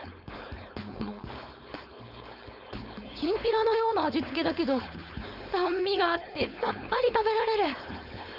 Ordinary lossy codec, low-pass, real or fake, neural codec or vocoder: none; 5.4 kHz; fake; codec, 16 kHz, 4.8 kbps, FACodec